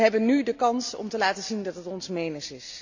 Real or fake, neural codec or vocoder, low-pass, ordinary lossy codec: real; none; 7.2 kHz; none